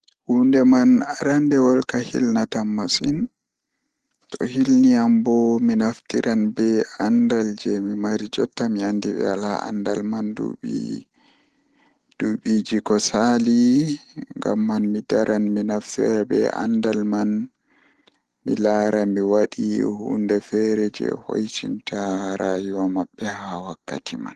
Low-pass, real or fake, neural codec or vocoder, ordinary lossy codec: 10.8 kHz; real; none; Opus, 16 kbps